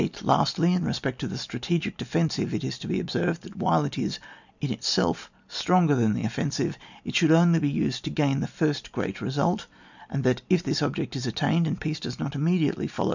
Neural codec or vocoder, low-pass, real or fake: none; 7.2 kHz; real